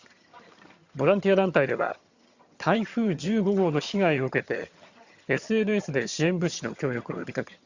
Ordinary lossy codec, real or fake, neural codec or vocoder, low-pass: Opus, 64 kbps; fake; vocoder, 22.05 kHz, 80 mel bands, HiFi-GAN; 7.2 kHz